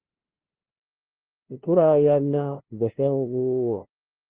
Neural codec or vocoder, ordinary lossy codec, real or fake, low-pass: codec, 16 kHz, 0.5 kbps, FunCodec, trained on LibriTTS, 25 frames a second; Opus, 16 kbps; fake; 3.6 kHz